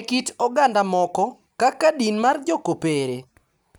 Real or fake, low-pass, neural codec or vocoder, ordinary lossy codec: real; none; none; none